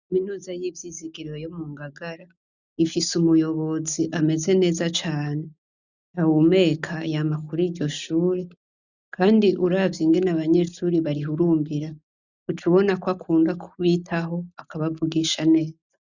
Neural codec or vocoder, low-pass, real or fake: none; 7.2 kHz; real